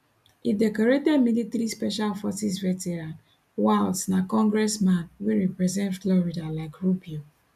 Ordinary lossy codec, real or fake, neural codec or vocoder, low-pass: none; real; none; 14.4 kHz